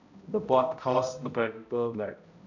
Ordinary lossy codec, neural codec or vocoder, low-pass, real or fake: none; codec, 16 kHz, 0.5 kbps, X-Codec, HuBERT features, trained on balanced general audio; 7.2 kHz; fake